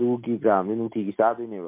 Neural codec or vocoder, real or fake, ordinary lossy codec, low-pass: none; real; MP3, 32 kbps; 3.6 kHz